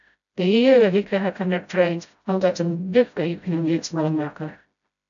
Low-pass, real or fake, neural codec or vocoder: 7.2 kHz; fake; codec, 16 kHz, 0.5 kbps, FreqCodec, smaller model